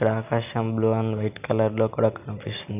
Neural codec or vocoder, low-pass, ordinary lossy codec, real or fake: none; 3.6 kHz; none; real